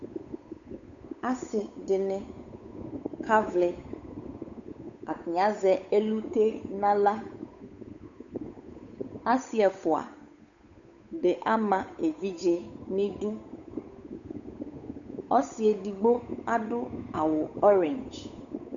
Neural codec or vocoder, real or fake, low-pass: codec, 16 kHz, 8 kbps, FunCodec, trained on Chinese and English, 25 frames a second; fake; 7.2 kHz